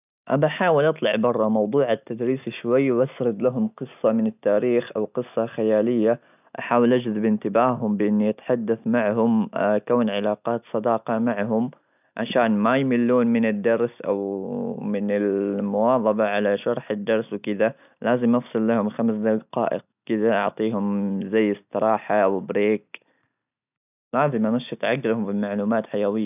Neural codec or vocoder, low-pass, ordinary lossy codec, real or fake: none; 3.6 kHz; none; real